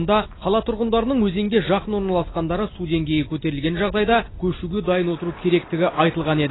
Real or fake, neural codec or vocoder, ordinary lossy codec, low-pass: real; none; AAC, 16 kbps; 7.2 kHz